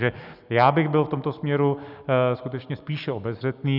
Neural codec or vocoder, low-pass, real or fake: none; 5.4 kHz; real